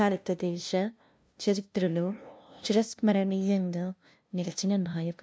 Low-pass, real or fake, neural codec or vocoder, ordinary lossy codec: none; fake; codec, 16 kHz, 0.5 kbps, FunCodec, trained on LibriTTS, 25 frames a second; none